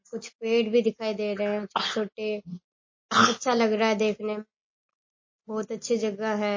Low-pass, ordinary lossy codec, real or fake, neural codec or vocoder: 7.2 kHz; MP3, 32 kbps; real; none